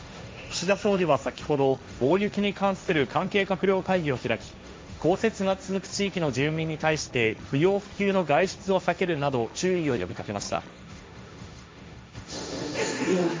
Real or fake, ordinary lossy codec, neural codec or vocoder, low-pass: fake; none; codec, 16 kHz, 1.1 kbps, Voila-Tokenizer; none